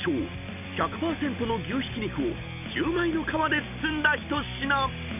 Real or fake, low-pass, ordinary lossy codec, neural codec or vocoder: real; 3.6 kHz; none; none